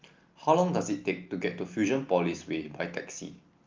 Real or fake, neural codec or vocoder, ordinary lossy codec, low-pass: real; none; Opus, 24 kbps; 7.2 kHz